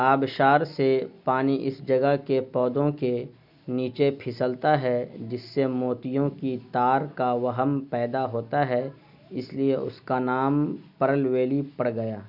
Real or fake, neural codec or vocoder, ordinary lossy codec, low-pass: real; none; none; 5.4 kHz